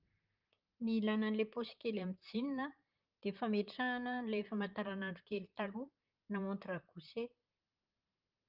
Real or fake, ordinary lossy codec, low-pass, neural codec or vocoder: fake; Opus, 24 kbps; 5.4 kHz; vocoder, 44.1 kHz, 128 mel bands, Pupu-Vocoder